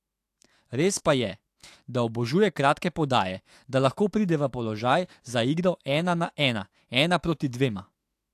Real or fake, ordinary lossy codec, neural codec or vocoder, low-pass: fake; AAC, 64 kbps; autoencoder, 48 kHz, 128 numbers a frame, DAC-VAE, trained on Japanese speech; 14.4 kHz